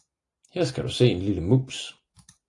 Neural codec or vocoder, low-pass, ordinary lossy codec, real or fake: none; 10.8 kHz; AAC, 48 kbps; real